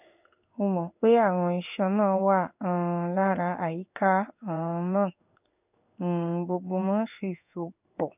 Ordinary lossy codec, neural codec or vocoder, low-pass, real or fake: none; codec, 16 kHz in and 24 kHz out, 1 kbps, XY-Tokenizer; 3.6 kHz; fake